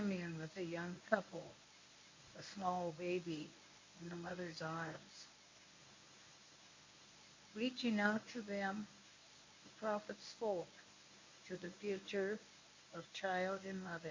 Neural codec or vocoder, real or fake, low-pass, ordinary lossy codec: codec, 24 kHz, 0.9 kbps, WavTokenizer, medium speech release version 1; fake; 7.2 kHz; MP3, 64 kbps